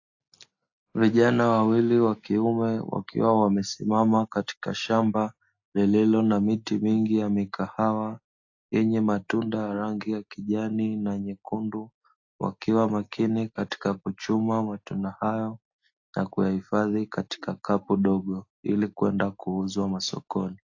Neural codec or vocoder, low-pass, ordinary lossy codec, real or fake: none; 7.2 kHz; AAC, 48 kbps; real